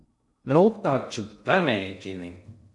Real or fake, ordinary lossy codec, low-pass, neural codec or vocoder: fake; MP3, 64 kbps; 10.8 kHz; codec, 16 kHz in and 24 kHz out, 0.6 kbps, FocalCodec, streaming, 2048 codes